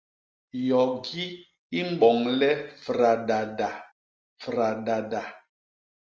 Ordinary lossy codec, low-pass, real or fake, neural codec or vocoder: Opus, 24 kbps; 7.2 kHz; real; none